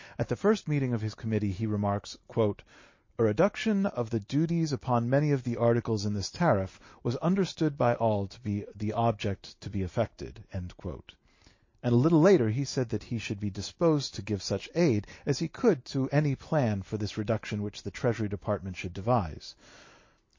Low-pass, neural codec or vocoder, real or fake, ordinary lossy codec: 7.2 kHz; none; real; MP3, 32 kbps